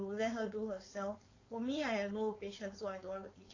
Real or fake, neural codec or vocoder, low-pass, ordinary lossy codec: fake; codec, 16 kHz, 4.8 kbps, FACodec; 7.2 kHz; AAC, 32 kbps